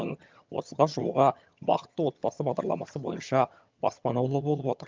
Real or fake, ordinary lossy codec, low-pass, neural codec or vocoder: fake; Opus, 24 kbps; 7.2 kHz; vocoder, 22.05 kHz, 80 mel bands, HiFi-GAN